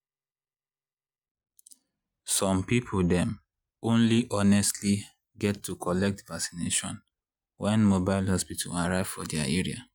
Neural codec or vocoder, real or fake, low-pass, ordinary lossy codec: none; real; 19.8 kHz; none